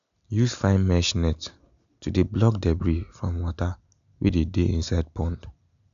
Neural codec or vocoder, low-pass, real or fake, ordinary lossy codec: none; 7.2 kHz; real; none